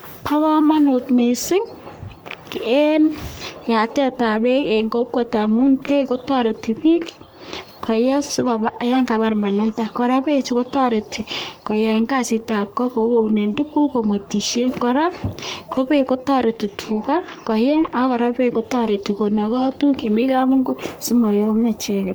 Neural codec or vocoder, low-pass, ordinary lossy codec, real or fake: codec, 44.1 kHz, 3.4 kbps, Pupu-Codec; none; none; fake